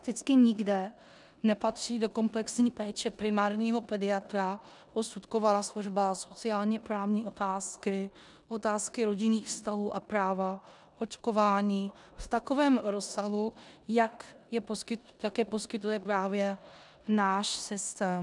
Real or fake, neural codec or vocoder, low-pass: fake; codec, 16 kHz in and 24 kHz out, 0.9 kbps, LongCat-Audio-Codec, four codebook decoder; 10.8 kHz